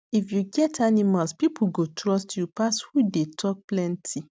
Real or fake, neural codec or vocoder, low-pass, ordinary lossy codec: real; none; none; none